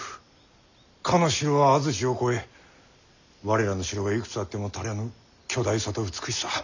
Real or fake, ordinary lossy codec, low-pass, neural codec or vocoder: real; none; 7.2 kHz; none